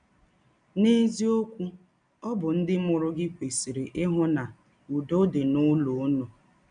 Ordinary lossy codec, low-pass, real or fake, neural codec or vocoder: none; 9.9 kHz; real; none